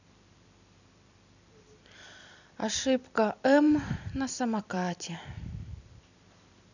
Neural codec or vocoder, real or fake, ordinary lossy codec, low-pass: vocoder, 44.1 kHz, 128 mel bands every 256 samples, BigVGAN v2; fake; none; 7.2 kHz